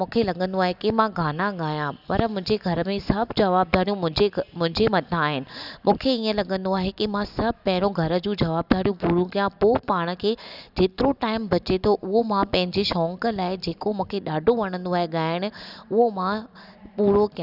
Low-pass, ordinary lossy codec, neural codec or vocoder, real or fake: 5.4 kHz; none; none; real